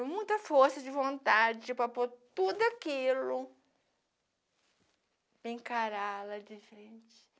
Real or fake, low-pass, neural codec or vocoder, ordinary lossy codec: real; none; none; none